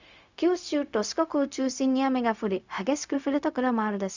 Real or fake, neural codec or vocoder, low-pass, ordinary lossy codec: fake; codec, 16 kHz, 0.4 kbps, LongCat-Audio-Codec; 7.2 kHz; Opus, 64 kbps